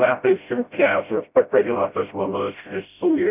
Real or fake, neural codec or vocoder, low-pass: fake; codec, 16 kHz, 0.5 kbps, FreqCodec, smaller model; 3.6 kHz